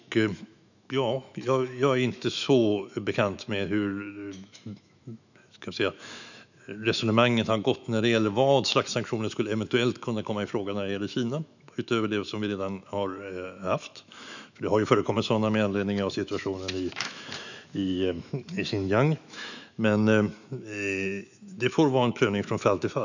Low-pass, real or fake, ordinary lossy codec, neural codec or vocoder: 7.2 kHz; fake; none; autoencoder, 48 kHz, 128 numbers a frame, DAC-VAE, trained on Japanese speech